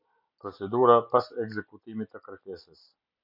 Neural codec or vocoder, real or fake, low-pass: none; real; 5.4 kHz